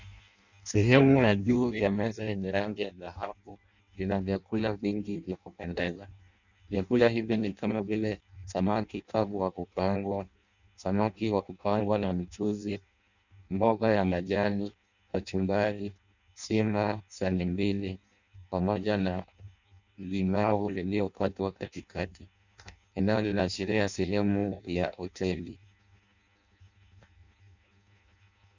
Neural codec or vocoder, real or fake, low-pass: codec, 16 kHz in and 24 kHz out, 0.6 kbps, FireRedTTS-2 codec; fake; 7.2 kHz